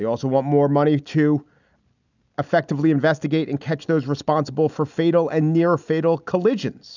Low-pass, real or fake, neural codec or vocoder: 7.2 kHz; real; none